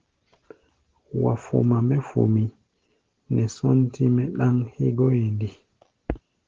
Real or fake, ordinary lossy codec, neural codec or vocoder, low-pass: real; Opus, 16 kbps; none; 7.2 kHz